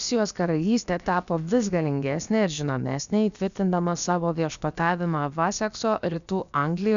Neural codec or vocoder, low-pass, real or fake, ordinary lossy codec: codec, 16 kHz, about 1 kbps, DyCAST, with the encoder's durations; 7.2 kHz; fake; MP3, 96 kbps